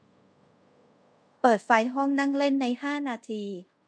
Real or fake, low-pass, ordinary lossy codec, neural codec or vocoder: fake; 9.9 kHz; MP3, 64 kbps; codec, 24 kHz, 0.5 kbps, DualCodec